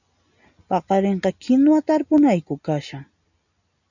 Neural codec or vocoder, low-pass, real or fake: none; 7.2 kHz; real